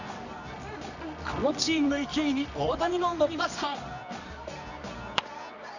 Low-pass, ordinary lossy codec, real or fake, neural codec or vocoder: 7.2 kHz; none; fake; codec, 24 kHz, 0.9 kbps, WavTokenizer, medium music audio release